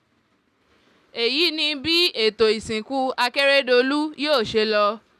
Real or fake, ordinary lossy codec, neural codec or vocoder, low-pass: real; none; none; 14.4 kHz